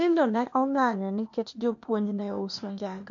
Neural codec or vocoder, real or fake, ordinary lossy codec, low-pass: codec, 16 kHz, 0.8 kbps, ZipCodec; fake; MP3, 48 kbps; 7.2 kHz